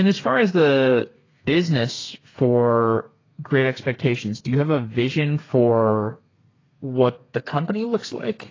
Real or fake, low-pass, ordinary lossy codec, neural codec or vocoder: fake; 7.2 kHz; AAC, 32 kbps; codec, 32 kHz, 1.9 kbps, SNAC